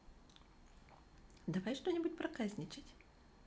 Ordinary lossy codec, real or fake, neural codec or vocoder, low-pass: none; real; none; none